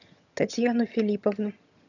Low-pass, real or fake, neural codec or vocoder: 7.2 kHz; fake; vocoder, 22.05 kHz, 80 mel bands, HiFi-GAN